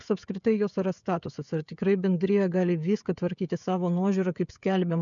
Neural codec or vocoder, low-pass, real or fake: codec, 16 kHz, 16 kbps, FreqCodec, smaller model; 7.2 kHz; fake